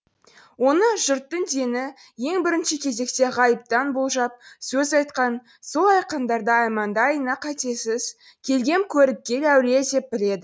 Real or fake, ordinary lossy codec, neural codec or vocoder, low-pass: real; none; none; none